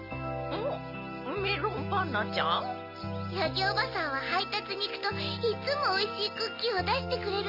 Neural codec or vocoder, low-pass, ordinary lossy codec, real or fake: none; 5.4 kHz; none; real